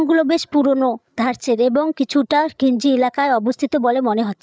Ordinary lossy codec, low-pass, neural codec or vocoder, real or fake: none; none; codec, 16 kHz, 8 kbps, FreqCodec, larger model; fake